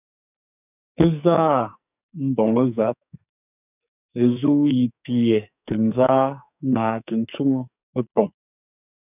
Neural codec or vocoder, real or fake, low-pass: codec, 44.1 kHz, 2.6 kbps, SNAC; fake; 3.6 kHz